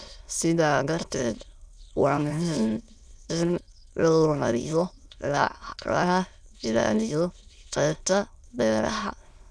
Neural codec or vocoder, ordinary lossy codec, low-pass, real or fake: autoencoder, 22.05 kHz, a latent of 192 numbers a frame, VITS, trained on many speakers; none; none; fake